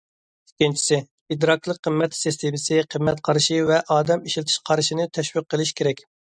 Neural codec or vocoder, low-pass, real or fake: none; 9.9 kHz; real